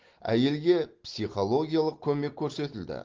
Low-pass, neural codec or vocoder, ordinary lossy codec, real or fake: 7.2 kHz; none; Opus, 16 kbps; real